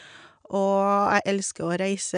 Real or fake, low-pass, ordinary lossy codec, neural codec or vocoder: real; 9.9 kHz; none; none